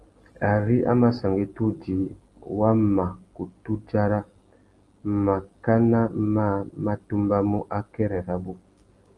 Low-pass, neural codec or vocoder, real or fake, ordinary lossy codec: 10.8 kHz; none; real; Opus, 24 kbps